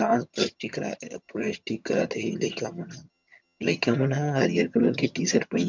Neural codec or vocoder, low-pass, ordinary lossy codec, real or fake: vocoder, 22.05 kHz, 80 mel bands, HiFi-GAN; 7.2 kHz; MP3, 64 kbps; fake